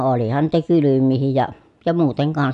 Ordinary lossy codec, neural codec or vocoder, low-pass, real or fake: none; none; 10.8 kHz; real